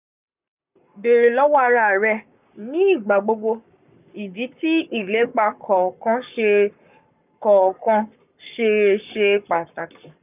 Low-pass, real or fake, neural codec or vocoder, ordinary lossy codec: 3.6 kHz; fake; codec, 44.1 kHz, 7.8 kbps, Pupu-Codec; none